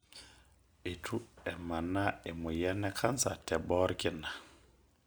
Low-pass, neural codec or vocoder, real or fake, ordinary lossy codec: none; none; real; none